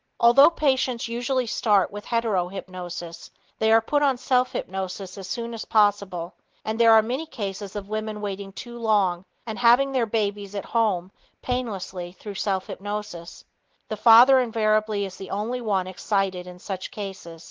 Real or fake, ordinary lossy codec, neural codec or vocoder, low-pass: real; Opus, 16 kbps; none; 7.2 kHz